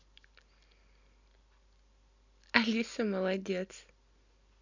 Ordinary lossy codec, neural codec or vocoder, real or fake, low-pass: none; none; real; 7.2 kHz